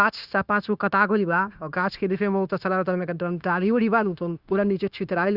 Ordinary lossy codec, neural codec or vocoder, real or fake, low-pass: Opus, 64 kbps; codec, 16 kHz, 0.9 kbps, LongCat-Audio-Codec; fake; 5.4 kHz